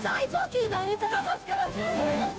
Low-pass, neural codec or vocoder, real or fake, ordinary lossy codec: none; codec, 16 kHz, 0.5 kbps, FunCodec, trained on Chinese and English, 25 frames a second; fake; none